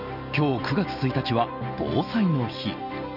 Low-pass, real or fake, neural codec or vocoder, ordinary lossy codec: 5.4 kHz; real; none; none